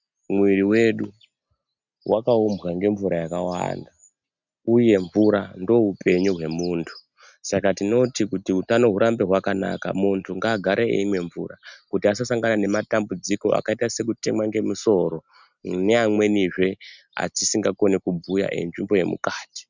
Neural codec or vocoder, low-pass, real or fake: none; 7.2 kHz; real